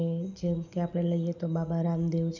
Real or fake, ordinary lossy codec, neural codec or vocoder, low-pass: real; none; none; 7.2 kHz